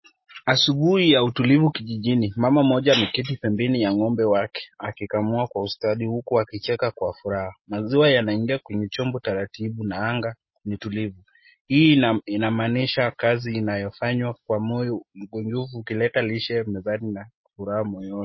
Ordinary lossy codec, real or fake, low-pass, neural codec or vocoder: MP3, 24 kbps; real; 7.2 kHz; none